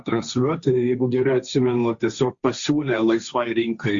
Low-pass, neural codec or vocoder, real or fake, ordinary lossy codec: 7.2 kHz; codec, 16 kHz, 1.1 kbps, Voila-Tokenizer; fake; Opus, 64 kbps